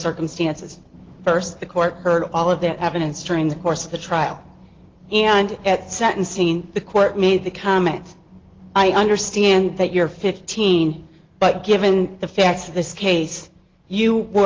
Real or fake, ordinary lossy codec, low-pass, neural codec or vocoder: real; Opus, 16 kbps; 7.2 kHz; none